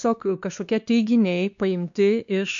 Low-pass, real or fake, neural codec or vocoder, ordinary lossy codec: 7.2 kHz; fake; codec, 16 kHz, 2 kbps, X-Codec, WavLM features, trained on Multilingual LibriSpeech; MP3, 64 kbps